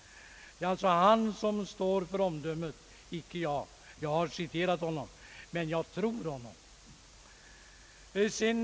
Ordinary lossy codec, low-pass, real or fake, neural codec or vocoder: none; none; real; none